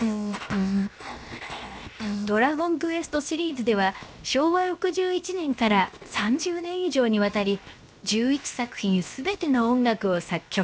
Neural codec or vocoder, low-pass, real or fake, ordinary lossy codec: codec, 16 kHz, 0.7 kbps, FocalCodec; none; fake; none